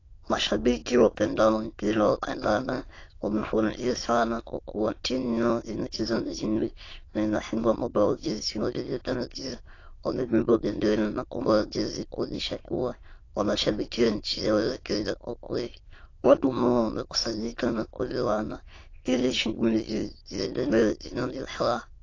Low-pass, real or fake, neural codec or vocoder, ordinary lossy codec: 7.2 kHz; fake; autoencoder, 22.05 kHz, a latent of 192 numbers a frame, VITS, trained on many speakers; AAC, 32 kbps